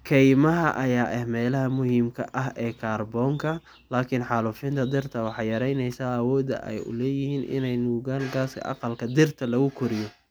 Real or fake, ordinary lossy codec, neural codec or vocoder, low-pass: real; none; none; none